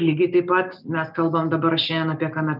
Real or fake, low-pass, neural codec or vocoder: real; 5.4 kHz; none